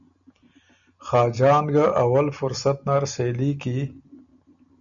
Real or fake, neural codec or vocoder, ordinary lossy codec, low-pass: real; none; MP3, 96 kbps; 7.2 kHz